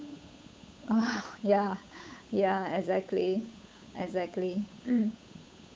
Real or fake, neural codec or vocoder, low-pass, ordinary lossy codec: fake; codec, 16 kHz, 8 kbps, FunCodec, trained on Chinese and English, 25 frames a second; none; none